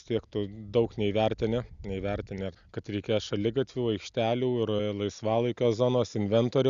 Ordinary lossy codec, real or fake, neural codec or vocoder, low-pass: Opus, 64 kbps; real; none; 7.2 kHz